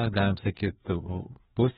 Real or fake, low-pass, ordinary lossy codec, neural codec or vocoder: fake; 7.2 kHz; AAC, 16 kbps; codec, 16 kHz, 2 kbps, FreqCodec, larger model